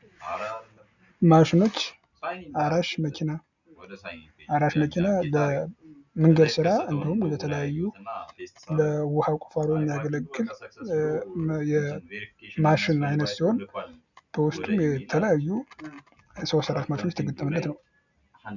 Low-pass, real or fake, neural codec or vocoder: 7.2 kHz; real; none